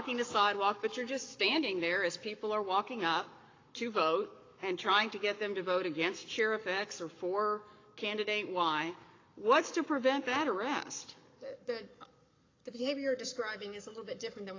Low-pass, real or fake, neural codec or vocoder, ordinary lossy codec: 7.2 kHz; fake; codec, 44.1 kHz, 7.8 kbps, Pupu-Codec; AAC, 32 kbps